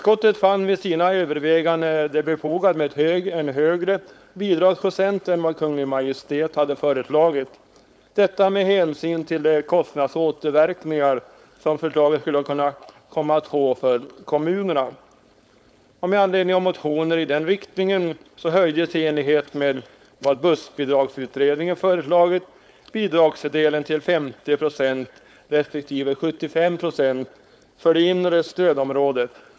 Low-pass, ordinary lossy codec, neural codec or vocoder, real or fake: none; none; codec, 16 kHz, 4.8 kbps, FACodec; fake